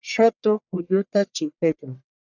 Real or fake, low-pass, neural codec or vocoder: fake; 7.2 kHz; codec, 44.1 kHz, 1.7 kbps, Pupu-Codec